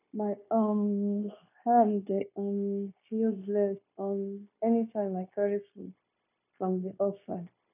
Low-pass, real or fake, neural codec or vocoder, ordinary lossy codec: 3.6 kHz; fake; codec, 16 kHz, 0.9 kbps, LongCat-Audio-Codec; none